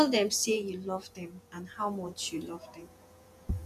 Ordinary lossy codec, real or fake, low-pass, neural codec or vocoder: none; fake; 14.4 kHz; vocoder, 48 kHz, 128 mel bands, Vocos